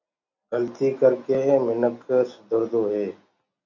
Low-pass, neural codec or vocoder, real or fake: 7.2 kHz; none; real